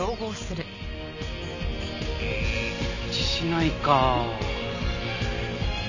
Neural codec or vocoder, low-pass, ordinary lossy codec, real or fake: none; 7.2 kHz; none; real